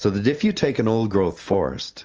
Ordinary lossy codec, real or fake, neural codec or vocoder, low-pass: Opus, 24 kbps; real; none; 7.2 kHz